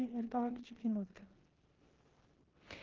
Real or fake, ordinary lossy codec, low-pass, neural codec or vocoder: fake; Opus, 16 kbps; 7.2 kHz; codec, 16 kHz in and 24 kHz out, 0.9 kbps, LongCat-Audio-Codec, four codebook decoder